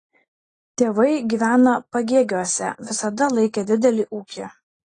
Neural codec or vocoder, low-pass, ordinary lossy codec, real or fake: none; 9.9 kHz; AAC, 32 kbps; real